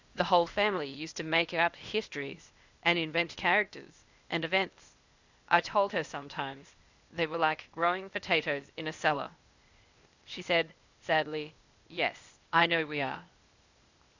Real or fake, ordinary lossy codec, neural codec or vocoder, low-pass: fake; Opus, 64 kbps; codec, 16 kHz, 0.8 kbps, ZipCodec; 7.2 kHz